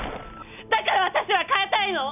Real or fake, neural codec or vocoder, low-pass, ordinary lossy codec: real; none; 3.6 kHz; none